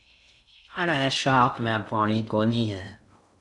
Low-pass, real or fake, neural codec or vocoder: 10.8 kHz; fake; codec, 16 kHz in and 24 kHz out, 0.6 kbps, FocalCodec, streaming, 2048 codes